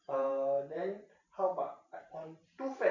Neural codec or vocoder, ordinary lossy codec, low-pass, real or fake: vocoder, 44.1 kHz, 128 mel bands every 512 samples, BigVGAN v2; none; 7.2 kHz; fake